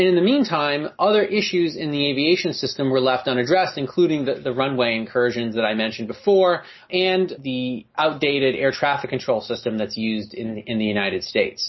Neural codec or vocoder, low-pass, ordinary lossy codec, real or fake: none; 7.2 kHz; MP3, 24 kbps; real